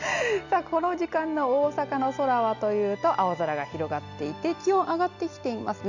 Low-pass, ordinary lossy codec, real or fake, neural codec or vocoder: 7.2 kHz; none; real; none